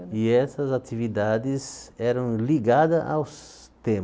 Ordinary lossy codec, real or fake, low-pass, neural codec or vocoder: none; real; none; none